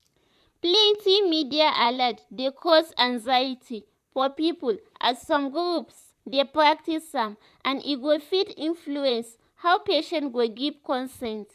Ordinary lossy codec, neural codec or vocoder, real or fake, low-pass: none; vocoder, 44.1 kHz, 128 mel bands, Pupu-Vocoder; fake; 14.4 kHz